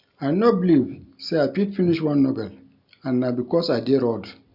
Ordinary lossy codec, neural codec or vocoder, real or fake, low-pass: none; none; real; 5.4 kHz